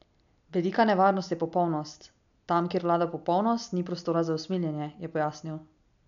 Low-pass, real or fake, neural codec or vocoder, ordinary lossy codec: 7.2 kHz; real; none; none